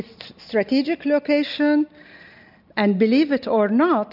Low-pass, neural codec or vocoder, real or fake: 5.4 kHz; none; real